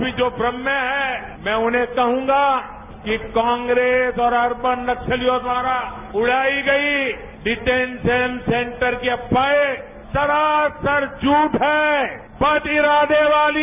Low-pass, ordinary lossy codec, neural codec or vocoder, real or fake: 3.6 kHz; none; none; real